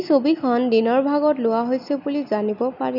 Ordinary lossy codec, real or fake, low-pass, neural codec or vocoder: none; real; 5.4 kHz; none